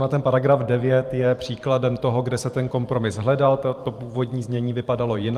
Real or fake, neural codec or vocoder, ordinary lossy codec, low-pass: fake; vocoder, 44.1 kHz, 128 mel bands every 512 samples, BigVGAN v2; Opus, 32 kbps; 14.4 kHz